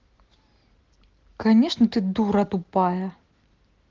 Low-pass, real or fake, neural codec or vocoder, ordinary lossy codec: 7.2 kHz; real; none; Opus, 16 kbps